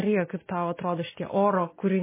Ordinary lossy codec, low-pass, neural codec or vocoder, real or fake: MP3, 16 kbps; 3.6 kHz; none; real